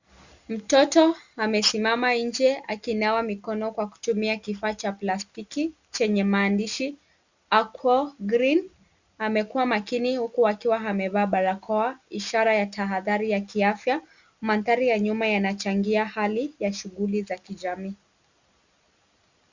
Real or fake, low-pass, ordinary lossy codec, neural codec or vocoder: real; 7.2 kHz; Opus, 64 kbps; none